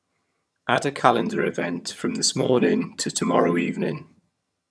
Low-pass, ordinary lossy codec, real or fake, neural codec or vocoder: none; none; fake; vocoder, 22.05 kHz, 80 mel bands, HiFi-GAN